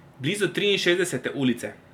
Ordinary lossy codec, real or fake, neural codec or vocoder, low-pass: none; real; none; 19.8 kHz